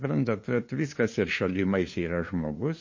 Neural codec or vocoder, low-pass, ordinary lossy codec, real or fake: codec, 16 kHz, 2 kbps, FunCodec, trained on LibriTTS, 25 frames a second; 7.2 kHz; MP3, 32 kbps; fake